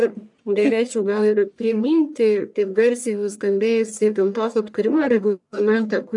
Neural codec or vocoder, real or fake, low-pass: codec, 44.1 kHz, 1.7 kbps, Pupu-Codec; fake; 10.8 kHz